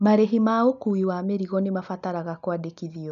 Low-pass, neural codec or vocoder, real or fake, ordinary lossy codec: 7.2 kHz; none; real; none